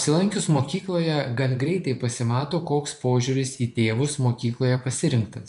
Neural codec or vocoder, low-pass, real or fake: vocoder, 24 kHz, 100 mel bands, Vocos; 10.8 kHz; fake